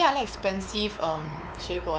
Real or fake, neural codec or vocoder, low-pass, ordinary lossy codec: fake; codec, 16 kHz, 4 kbps, X-Codec, WavLM features, trained on Multilingual LibriSpeech; none; none